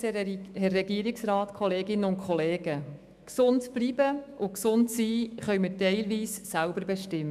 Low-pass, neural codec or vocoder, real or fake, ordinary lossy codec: 14.4 kHz; autoencoder, 48 kHz, 128 numbers a frame, DAC-VAE, trained on Japanese speech; fake; none